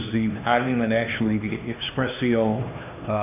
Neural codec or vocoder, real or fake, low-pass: codec, 16 kHz, 2 kbps, X-Codec, HuBERT features, trained on LibriSpeech; fake; 3.6 kHz